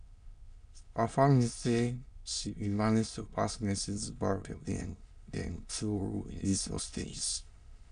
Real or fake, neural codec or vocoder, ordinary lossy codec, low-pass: fake; autoencoder, 22.05 kHz, a latent of 192 numbers a frame, VITS, trained on many speakers; none; 9.9 kHz